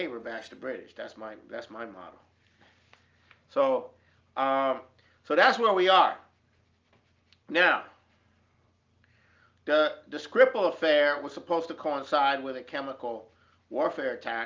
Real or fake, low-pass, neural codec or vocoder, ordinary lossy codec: real; 7.2 kHz; none; Opus, 24 kbps